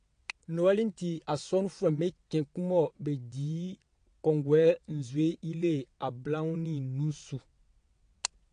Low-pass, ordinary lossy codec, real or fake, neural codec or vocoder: 9.9 kHz; AAC, 48 kbps; fake; vocoder, 22.05 kHz, 80 mel bands, WaveNeXt